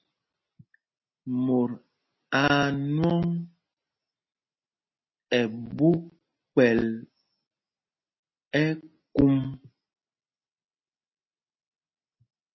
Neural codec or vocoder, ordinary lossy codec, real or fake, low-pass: none; MP3, 24 kbps; real; 7.2 kHz